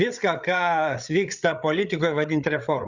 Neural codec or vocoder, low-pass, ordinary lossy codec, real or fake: codec, 16 kHz, 8 kbps, FreqCodec, larger model; 7.2 kHz; Opus, 64 kbps; fake